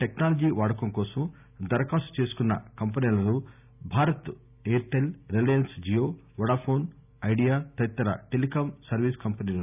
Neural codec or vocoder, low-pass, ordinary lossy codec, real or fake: none; 3.6 kHz; none; real